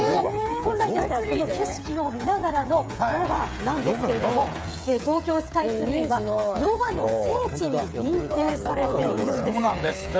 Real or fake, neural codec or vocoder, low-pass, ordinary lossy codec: fake; codec, 16 kHz, 8 kbps, FreqCodec, smaller model; none; none